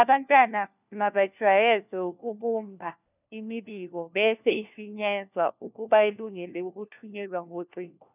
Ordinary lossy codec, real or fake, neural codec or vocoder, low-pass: none; fake; codec, 16 kHz, 1 kbps, FunCodec, trained on LibriTTS, 50 frames a second; 3.6 kHz